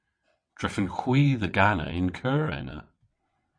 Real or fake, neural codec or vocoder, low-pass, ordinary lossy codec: fake; vocoder, 22.05 kHz, 80 mel bands, WaveNeXt; 9.9 kHz; MP3, 48 kbps